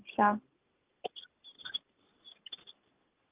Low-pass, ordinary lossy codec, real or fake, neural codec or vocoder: 3.6 kHz; Opus, 24 kbps; real; none